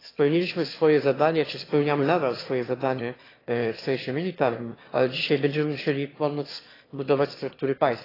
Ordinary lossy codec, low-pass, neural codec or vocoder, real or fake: AAC, 24 kbps; 5.4 kHz; autoencoder, 22.05 kHz, a latent of 192 numbers a frame, VITS, trained on one speaker; fake